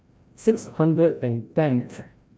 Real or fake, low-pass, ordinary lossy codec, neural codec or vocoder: fake; none; none; codec, 16 kHz, 0.5 kbps, FreqCodec, larger model